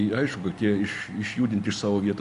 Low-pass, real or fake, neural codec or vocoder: 10.8 kHz; real; none